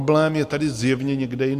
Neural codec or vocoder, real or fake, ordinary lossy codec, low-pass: none; real; AAC, 96 kbps; 14.4 kHz